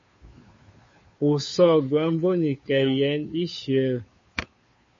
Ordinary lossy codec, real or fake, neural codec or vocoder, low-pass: MP3, 32 kbps; fake; codec, 16 kHz, 2 kbps, FunCodec, trained on Chinese and English, 25 frames a second; 7.2 kHz